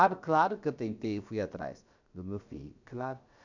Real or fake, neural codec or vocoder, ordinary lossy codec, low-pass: fake; codec, 16 kHz, about 1 kbps, DyCAST, with the encoder's durations; none; 7.2 kHz